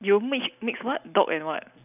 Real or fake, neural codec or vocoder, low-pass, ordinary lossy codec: real; none; 3.6 kHz; none